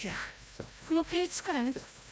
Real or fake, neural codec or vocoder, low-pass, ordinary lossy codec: fake; codec, 16 kHz, 0.5 kbps, FreqCodec, larger model; none; none